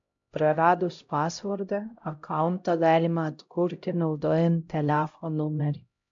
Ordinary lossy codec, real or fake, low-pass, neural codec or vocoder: AAC, 64 kbps; fake; 7.2 kHz; codec, 16 kHz, 0.5 kbps, X-Codec, HuBERT features, trained on LibriSpeech